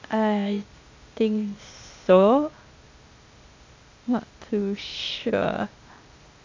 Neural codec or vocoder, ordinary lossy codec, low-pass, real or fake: codec, 16 kHz, 0.8 kbps, ZipCodec; MP3, 48 kbps; 7.2 kHz; fake